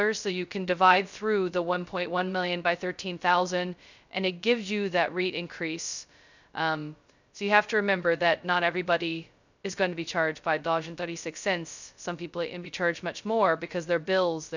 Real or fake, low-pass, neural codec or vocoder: fake; 7.2 kHz; codec, 16 kHz, 0.2 kbps, FocalCodec